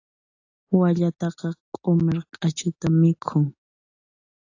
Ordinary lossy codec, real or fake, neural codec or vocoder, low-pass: AAC, 48 kbps; real; none; 7.2 kHz